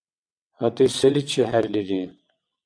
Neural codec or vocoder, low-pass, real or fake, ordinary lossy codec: vocoder, 22.05 kHz, 80 mel bands, WaveNeXt; 9.9 kHz; fake; MP3, 96 kbps